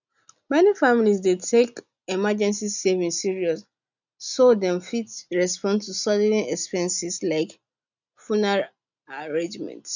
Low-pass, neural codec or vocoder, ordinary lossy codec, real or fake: 7.2 kHz; none; none; real